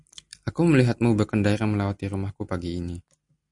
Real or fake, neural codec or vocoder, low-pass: real; none; 10.8 kHz